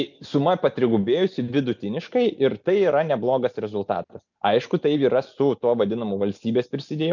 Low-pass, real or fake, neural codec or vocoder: 7.2 kHz; real; none